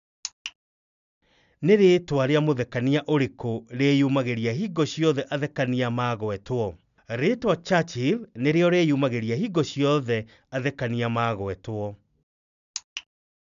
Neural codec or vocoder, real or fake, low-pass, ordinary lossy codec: none; real; 7.2 kHz; none